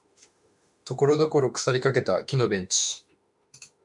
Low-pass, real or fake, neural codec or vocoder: 10.8 kHz; fake; autoencoder, 48 kHz, 32 numbers a frame, DAC-VAE, trained on Japanese speech